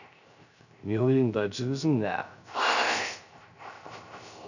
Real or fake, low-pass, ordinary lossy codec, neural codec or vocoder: fake; 7.2 kHz; none; codec, 16 kHz, 0.3 kbps, FocalCodec